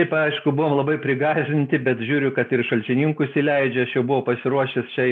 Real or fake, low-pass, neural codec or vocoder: real; 9.9 kHz; none